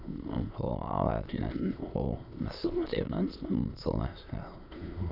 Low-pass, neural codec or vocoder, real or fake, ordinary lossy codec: 5.4 kHz; autoencoder, 22.05 kHz, a latent of 192 numbers a frame, VITS, trained on many speakers; fake; none